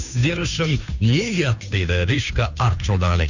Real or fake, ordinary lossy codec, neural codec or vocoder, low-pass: fake; none; codec, 16 kHz, 2 kbps, FunCodec, trained on Chinese and English, 25 frames a second; 7.2 kHz